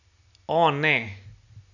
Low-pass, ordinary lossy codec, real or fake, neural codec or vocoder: 7.2 kHz; none; real; none